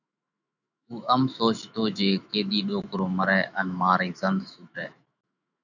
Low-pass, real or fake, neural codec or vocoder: 7.2 kHz; fake; autoencoder, 48 kHz, 128 numbers a frame, DAC-VAE, trained on Japanese speech